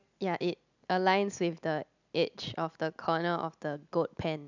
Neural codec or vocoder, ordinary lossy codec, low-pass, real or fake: none; none; 7.2 kHz; real